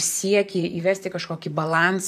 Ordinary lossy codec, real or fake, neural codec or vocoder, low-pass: AAC, 96 kbps; fake; vocoder, 44.1 kHz, 128 mel bands, Pupu-Vocoder; 14.4 kHz